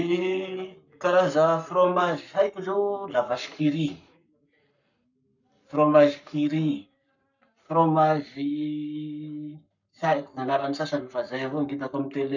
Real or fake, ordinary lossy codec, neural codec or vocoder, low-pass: fake; none; codec, 44.1 kHz, 7.8 kbps, Pupu-Codec; 7.2 kHz